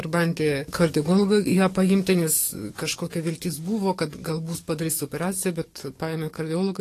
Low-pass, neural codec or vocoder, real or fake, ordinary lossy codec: 14.4 kHz; codec, 44.1 kHz, 7.8 kbps, DAC; fake; AAC, 48 kbps